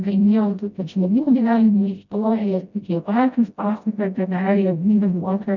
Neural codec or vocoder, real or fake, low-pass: codec, 16 kHz, 0.5 kbps, FreqCodec, smaller model; fake; 7.2 kHz